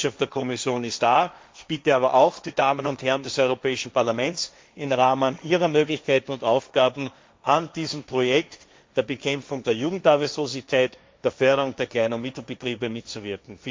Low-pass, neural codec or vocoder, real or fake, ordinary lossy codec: none; codec, 16 kHz, 1.1 kbps, Voila-Tokenizer; fake; none